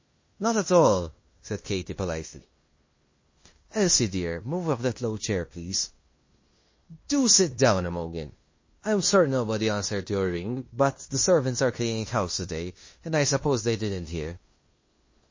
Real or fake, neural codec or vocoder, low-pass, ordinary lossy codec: fake; codec, 16 kHz in and 24 kHz out, 0.9 kbps, LongCat-Audio-Codec, fine tuned four codebook decoder; 7.2 kHz; MP3, 32 kbps